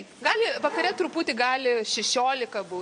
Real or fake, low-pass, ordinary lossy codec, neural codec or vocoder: fake; 9.9 kHz; MP3, 48 kbps; vocoder, 22.05 kHz, 80 mel bands, Vocos